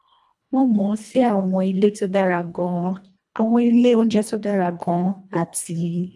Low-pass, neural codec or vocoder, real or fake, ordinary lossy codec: none; codec, 24 kHz, 1.5 kbps, HILCodec; fake; none